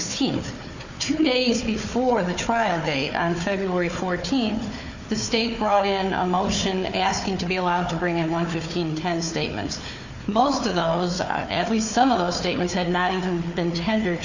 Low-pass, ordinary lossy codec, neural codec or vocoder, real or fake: 7.2 kHz; Opus, 64 kbps; codec, 16 kHz, 4 kbps, FunCodec, trained on Chinese and English, 50 frames a second; fake